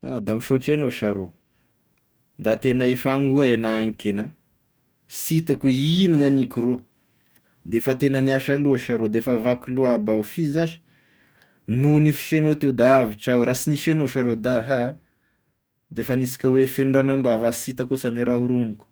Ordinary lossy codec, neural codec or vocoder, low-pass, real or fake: none; codec, 44.1 kHz, 2.6 kbps, DAC; none; fake